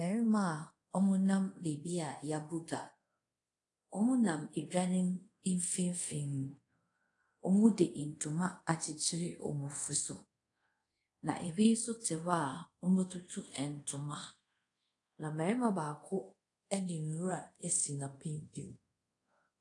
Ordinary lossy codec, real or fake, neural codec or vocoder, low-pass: AAC, 48 kbps; fake; codec, 24 kHz, 0.5 kbps, DualCodec; 10.8 kHz